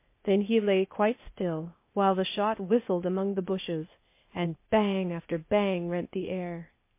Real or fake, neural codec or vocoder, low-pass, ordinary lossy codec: fake; codec, 16 kHz, 0.8 kbps, ZipCodec; 3.6 kHz; MP3, 24 kbps